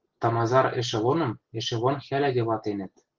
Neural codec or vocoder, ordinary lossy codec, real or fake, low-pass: none; Opus, 16 kbps; real; 7.2 kHz